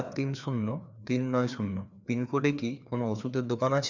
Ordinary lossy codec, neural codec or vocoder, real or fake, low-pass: none; codec, 16 kHz, 2 kbps, FreqCodec, larger model; fake; 7.2 kHz